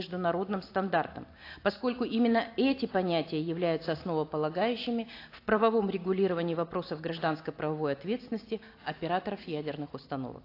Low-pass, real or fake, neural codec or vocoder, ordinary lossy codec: 5.4 kHz; real; none; AAC, 32 kbps